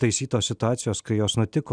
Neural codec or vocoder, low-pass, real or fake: none; 9.9 kHz; real